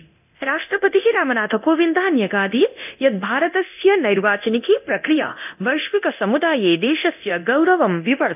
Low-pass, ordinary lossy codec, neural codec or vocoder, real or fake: 3.6 kHz; none; codec, 24 kHz, 0.9 kbps, DualCodec; fake